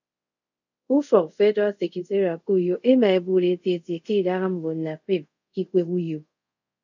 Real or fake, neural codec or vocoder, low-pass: fake; codec, 24 kHz, 0.5 kbps, DualCodec; 7.2 kHz